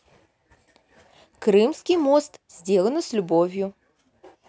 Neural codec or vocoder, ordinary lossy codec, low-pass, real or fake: none; none; none; real